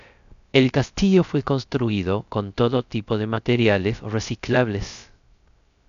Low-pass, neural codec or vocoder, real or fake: 7.2 kHz; codec, 16 kHz, 0.3 kbps, FocalCodec; fake